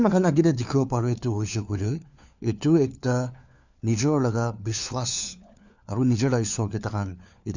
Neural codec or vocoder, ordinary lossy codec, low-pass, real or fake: codec, 16 kHz, 4 kbps, FunCodec, trained on LibriTTS, 50 frames a second; none; 7.2 kHz; fake